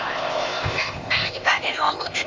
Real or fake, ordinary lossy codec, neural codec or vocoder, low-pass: fake; Opus, 32 kbps; codec, 16 kHz, 0.8 kbps, ZipCodec; 7.2 kHz